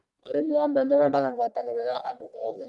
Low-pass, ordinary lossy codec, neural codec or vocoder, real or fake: 10.8 kHz; none; codec, 44.1 kHz, 1.7 kbps, Pupu-Codec; fake